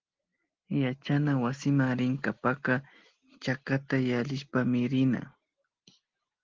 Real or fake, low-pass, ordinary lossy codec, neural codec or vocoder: real; 7.2 kHz; Opus, 16 kbps; none